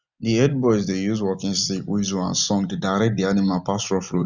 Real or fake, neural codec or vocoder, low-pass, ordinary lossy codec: real; none; 7.2 kHz; none